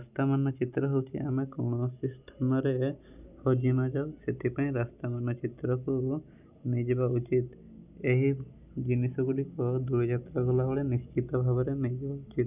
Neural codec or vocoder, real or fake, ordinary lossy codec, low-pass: none; real; none; 3.6 kHz